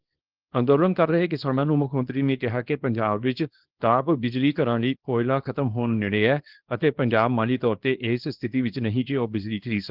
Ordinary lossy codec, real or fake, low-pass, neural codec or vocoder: Opus, 16 kbps; fake; 5.4 kHz; codec, 24 kHz, 0.9 kbps, WavTokenizer, small release